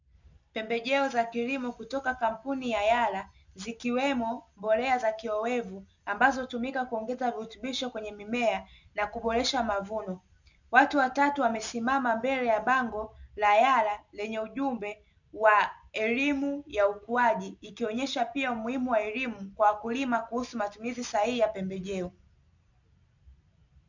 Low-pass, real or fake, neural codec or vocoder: 7.2 kHz; real; none